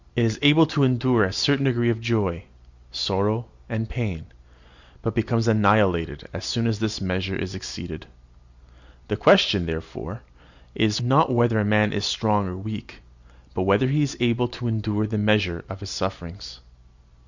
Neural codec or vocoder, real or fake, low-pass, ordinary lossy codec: none; real; 7.2 kHz; Opus, 64 kbps